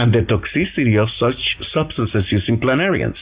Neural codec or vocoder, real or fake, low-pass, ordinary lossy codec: none; real; 3.6 kHz; Opus, 64 kbps